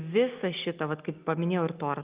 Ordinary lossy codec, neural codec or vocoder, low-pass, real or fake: Opus, 32 kbps; none; 3.6 kHz; real